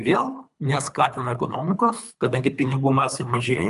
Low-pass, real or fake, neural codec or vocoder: 10.8 kHz; fake; codec, 24 kHz, 3 kbps, HILCodec